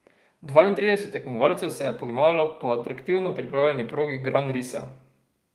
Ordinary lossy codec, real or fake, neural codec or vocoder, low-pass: Opus, 32 kbps; fake; codec, 32 kHz, 1.9 kbps, SNAC; 14.4 kHz